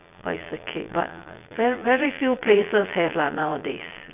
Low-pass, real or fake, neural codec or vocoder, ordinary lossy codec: 3.6 kHz; fake; vocoder, 22.05 kHz, 80 mel bands, Vocos; none